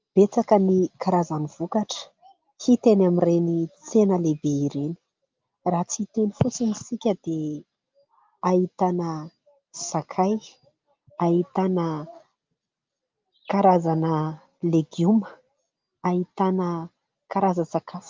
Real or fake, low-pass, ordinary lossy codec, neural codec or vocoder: real; 7.2 kHz; Opus, 24 kbps; none